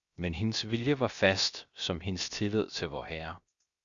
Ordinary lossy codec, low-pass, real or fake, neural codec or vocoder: Opus, 64 kbps; 7.2 kHz; fake; codec, 16 kHz, 0.7 kbps, FocalCodec